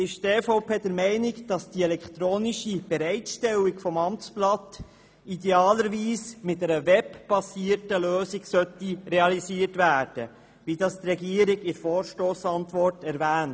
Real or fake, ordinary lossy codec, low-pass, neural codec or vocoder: real; none; none; none